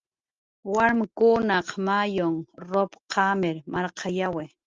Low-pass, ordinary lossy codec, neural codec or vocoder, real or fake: 7.2 kHz; Opus, 24 kbps; none; real